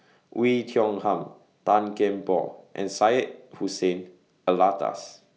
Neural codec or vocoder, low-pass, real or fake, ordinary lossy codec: none; none; real; none